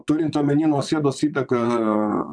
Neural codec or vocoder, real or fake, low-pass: vocoder, 22.05 kHz, 80 mel bands, WaveNeXt; fake; 9.9 kHz